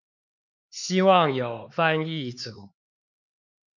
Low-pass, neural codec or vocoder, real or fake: 7.2 kHz; codec, 16 kHz, 4 kbps, X-Codec, HuBERT features, trained on LibriSpeech; fake